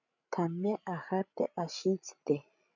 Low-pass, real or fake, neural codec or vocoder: 7.2 kHz; fake; codec, 16 kHz, 16 kbps, FreqCodec, larger model